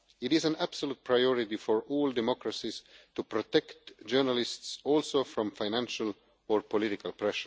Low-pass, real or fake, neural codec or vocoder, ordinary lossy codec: none; real; none; none